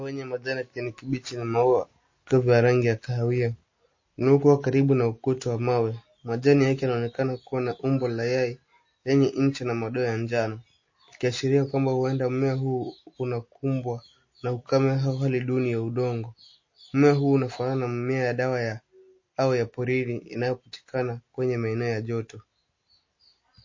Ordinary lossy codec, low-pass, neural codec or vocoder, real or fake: MP3, 32 kbps; 7.2 kHz; none; real